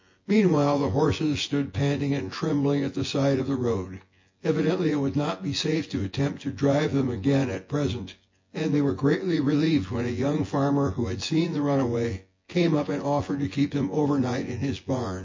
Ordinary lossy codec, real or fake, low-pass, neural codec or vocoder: MP3, 32 kbps; fake; 7.2 kHz; vocoder, 24 kHz, 100 mel bands, Vocos